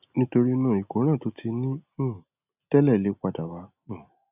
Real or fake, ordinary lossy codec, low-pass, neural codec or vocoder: real; none; 3.6 kHz; none